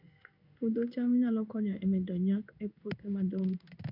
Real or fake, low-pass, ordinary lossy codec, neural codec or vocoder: fake; 5.4 kHz; none; codec, 16 kHz in and 24 kHz out, 1 kbps, XY-Tokenizer